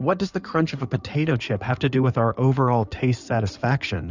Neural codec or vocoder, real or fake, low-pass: codec, 16 kHz in and 24 kHz out, 2.2 kbps, FireRedTTS-2 codec; fake; 7.2 kHz